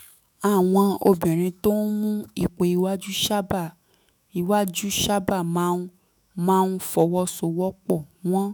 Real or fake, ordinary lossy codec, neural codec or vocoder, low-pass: fake; none; autoencoder, 48 kHz, 128 numbers a frame, DAC-VAE, trained on Japanese speech; none